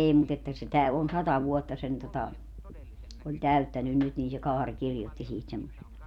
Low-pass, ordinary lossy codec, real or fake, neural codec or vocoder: 19.8 kHz; none; fake; vocoder, 44.1 kHz, 128 mel bands every 256 samples, BigVGAN v2